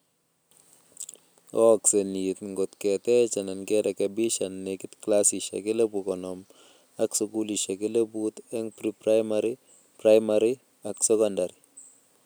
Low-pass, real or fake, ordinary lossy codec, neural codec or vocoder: none; real; none; none